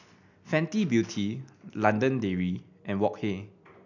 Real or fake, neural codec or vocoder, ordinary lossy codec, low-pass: real; none; none; 7.2 kHz